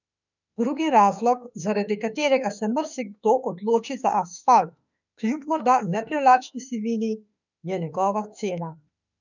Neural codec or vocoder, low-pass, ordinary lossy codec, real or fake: autoencoder, 48 kHz, 32 numbers a frame, DAC-VAE, trained on Japanese speech; 7.2 kHz; none; fake